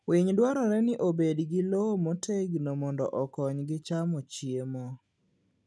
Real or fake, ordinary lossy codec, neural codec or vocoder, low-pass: real; none; none; none